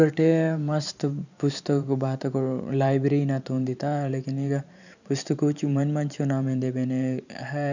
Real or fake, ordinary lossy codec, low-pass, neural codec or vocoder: fake; none; 7.2 kHz; vocoder, 44.1 kHz, 128 mel bands every 512 samples, BigVGAN v2